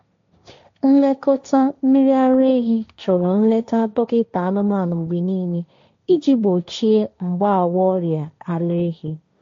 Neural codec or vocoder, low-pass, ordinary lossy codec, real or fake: codec, 16 kHz, 1.1 kbps, Voila-Tokenizer; 7.2 kHz; AAC, 48 kbps; fake